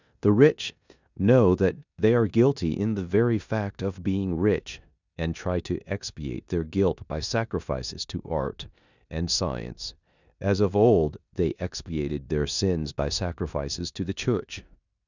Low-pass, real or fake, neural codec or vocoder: 7.2 kHz; fake; codec, 16 kHz in and 24 kHz out, 0.9 kbps, LongCat-Audio-Codec, four codebook decoder